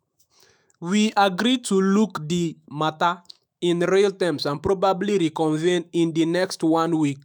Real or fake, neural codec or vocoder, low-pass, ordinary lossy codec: fake; autoencoder, 48 kHz, 128 numbers a frame, DAC-VAE, trained on Japanese speech; none; none